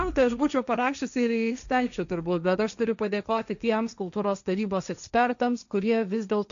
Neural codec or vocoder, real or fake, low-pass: codec, 16 kHz, 1.1 kbps, Voila-Tokenizer; fake; 7.2 kHz